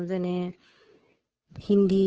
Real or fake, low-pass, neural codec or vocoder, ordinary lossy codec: fake; 7.2 kHz; codec, 16 kHz, 2 kbps, FunCodec, trained on Chinese and English, 25 frames a second; Opus, 16 kbps